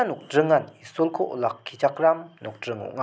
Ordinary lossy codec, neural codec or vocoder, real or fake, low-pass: none; none; real; none